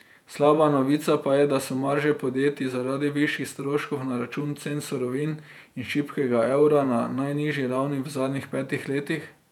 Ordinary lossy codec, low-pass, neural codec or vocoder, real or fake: none; 19.8 kHz; vocoder, 48 kHz, 128 mel bands, Vocos; fake